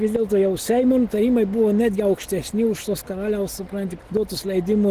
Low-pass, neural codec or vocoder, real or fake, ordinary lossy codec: 14.4 kHz; none; real; Opus, 16 kbps